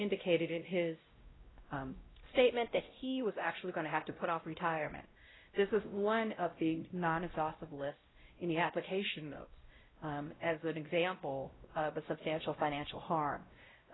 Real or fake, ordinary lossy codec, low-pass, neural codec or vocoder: fake; AAC, 16 kbps; 7.2 kHz; codec, 16 kHz, 0.5 kbps, X-Codec, WavLM features, trained on Multilingual LibriSpeech